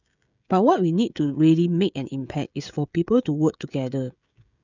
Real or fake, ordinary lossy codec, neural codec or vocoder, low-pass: fake; none; codec, 16 kHz, 16 kbps, FreqCodec, smaller model; 7.2 kHz